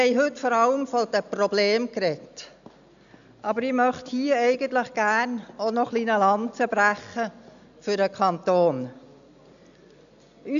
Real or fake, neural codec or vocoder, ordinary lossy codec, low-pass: real; none; none; 7.2 kHz